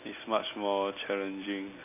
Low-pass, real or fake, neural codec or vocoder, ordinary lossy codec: 3.6 kHz; real; none; MP3, 24 kbps